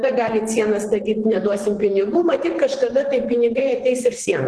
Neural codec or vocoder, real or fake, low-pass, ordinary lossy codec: vocoder, 44.1 kHz, 128 mel bands, Pupu-Vocoder; fake; 10.8 kHz; Opus, 16 kbps